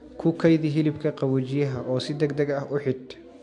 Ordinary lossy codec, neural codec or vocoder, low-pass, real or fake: MP3, 64 kbps; none; 10.8 kHz; real